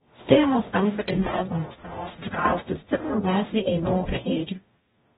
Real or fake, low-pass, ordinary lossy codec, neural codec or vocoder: fake; 19.8 kHz; AAC, 16 kbps; codec, 44.1 kHz, 0.9 kbps, DAC